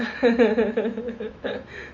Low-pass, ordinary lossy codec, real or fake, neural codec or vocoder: 7.2 kHz; MP3, 64 kbps; fake; autoencoder, 48 kHz, 128 numbers a frame, DAC-VAE, trained on Japanese speech